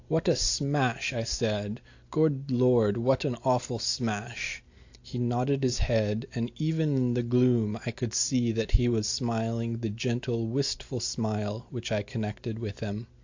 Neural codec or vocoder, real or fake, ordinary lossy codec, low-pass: none; real; AAC, 48 kbps; 7.2 kHz